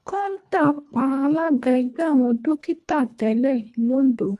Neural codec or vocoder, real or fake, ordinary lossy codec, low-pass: codec, 24 kHz, 1.5 kbps, HILCodec; fake; none; none